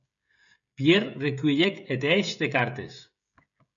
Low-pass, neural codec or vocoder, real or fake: 7.2 kHz; codec, 16 kHz, 16 kbps, FreqCodec, smaller model; fake